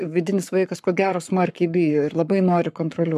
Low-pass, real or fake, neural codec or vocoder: 14.4 kHz; fake; codec, 44.1 kHz, 7.8 kbps, Pupu-Codec